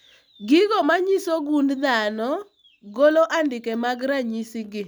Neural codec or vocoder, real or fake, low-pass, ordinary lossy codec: none; real; none; none